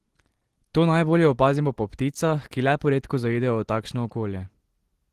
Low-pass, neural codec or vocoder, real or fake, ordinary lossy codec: 14.4 kHz; none; real; Opus, 16 kbps